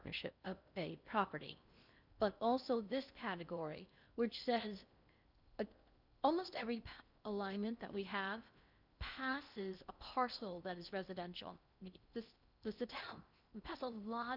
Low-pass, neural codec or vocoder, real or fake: 5.4 kHz; codec, 16 kHz in and 24 kHz out, 0.6 kbps, FocalCodec, streaming, 4096 codes; fake